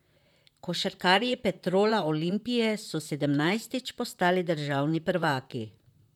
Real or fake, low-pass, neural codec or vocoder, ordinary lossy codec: fake; 19.8 kHz; vocoder, 44.1 kHz, 128 mel bands, Pupu-Vocoder; none